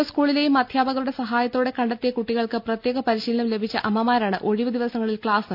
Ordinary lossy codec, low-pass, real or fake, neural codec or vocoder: none; 5.4 kHz; real; none